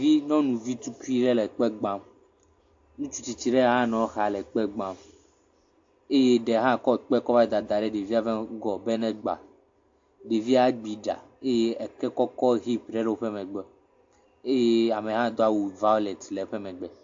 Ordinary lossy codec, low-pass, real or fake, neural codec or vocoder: AAC, 48 kbps; 7.2 kHz; real; none